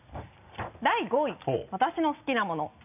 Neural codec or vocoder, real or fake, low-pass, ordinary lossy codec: none; real; 3.6 kHz; none